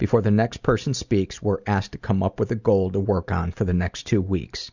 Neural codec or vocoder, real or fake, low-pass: none; real; 7.2 kHz